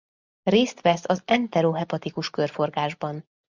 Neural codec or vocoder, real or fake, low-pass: none; real; 7.2 kHz